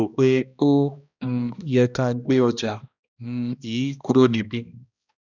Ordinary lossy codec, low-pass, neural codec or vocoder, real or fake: none; 7.2 kHz; codec, 16 kHz, 1 kbps, X-Codec, HuBERT features, trained on balanced general audio; fake